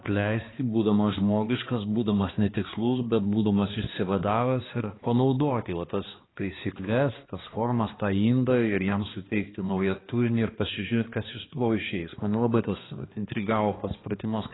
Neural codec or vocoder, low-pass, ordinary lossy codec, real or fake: codec, 16 kHz, 2 kbps, X-Codec, HuBERT features, trained on balanced general audio; 7.2 kHz; AAC, 16 kbps; fake